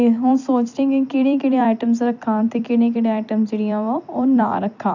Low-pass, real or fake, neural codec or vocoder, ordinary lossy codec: 7.2 kHz; fake; vocoder, 44.1 kHz, 128 mel bands every 256 samples, BigVGAN v2; none